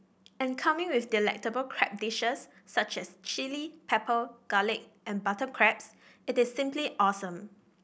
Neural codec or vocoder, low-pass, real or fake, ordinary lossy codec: none; none; real; none